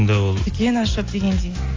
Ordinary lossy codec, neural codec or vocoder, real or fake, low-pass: AAC, 32 kbps; none; real; 7.2 kHz